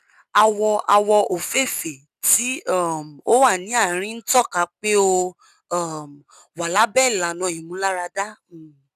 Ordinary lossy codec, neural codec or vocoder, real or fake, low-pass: none; none; real; 14.4 kHz